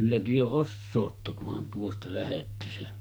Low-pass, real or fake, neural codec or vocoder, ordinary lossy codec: none; fake; codec, 44.1 kHz, 2.6 kbps, SNAC; none